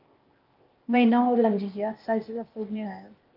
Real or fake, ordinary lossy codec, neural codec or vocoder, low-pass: fake; Opus, 24 kbps; codec, 16 kHz, 0.8 kbps, ZipCodec; 5.4 kHz